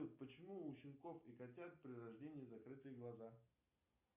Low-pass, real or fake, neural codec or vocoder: 3.6 kHz; real; none